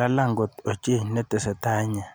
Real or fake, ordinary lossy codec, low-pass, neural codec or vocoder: real; none; none; none